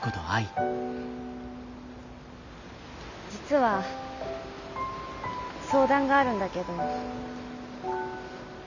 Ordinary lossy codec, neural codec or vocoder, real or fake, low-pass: none; none; real; 7.2 kHz